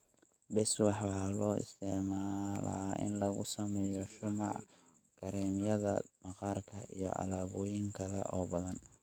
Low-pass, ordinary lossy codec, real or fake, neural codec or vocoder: none; none; fake; codec, 44.1 kHz, 7.8 kbps, DAC